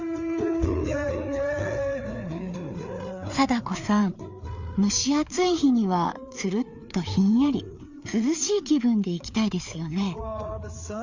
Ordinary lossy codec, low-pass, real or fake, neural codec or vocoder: Opus, 64 kbps; 7.2 kHz; fake; codec, 16 kHz, 4 kbps, FreqCodec, larger model